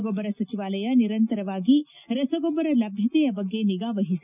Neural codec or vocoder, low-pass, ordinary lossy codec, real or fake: none; 3.6 kHz; none; real